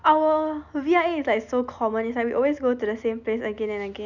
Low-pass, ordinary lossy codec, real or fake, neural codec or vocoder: 7.2 kHz; none; real; none